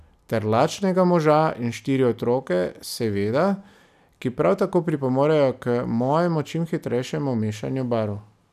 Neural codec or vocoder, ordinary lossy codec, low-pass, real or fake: none; none; 14.4 kHz; real